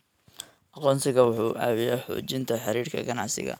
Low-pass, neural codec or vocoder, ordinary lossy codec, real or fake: none; none; none; real